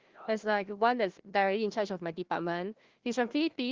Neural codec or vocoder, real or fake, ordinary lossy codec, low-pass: codec, 16 kHz, 1 kbps, FunCodec, trained on Chinese and English, 50 frames a second; fake; Opus, 16 kbps; 7.2 kHz